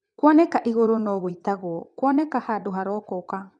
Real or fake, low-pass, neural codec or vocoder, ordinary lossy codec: fake; 9.9 kHz; vocoder, 22.05 kHz, 80 mel bands, WaveNeXt; none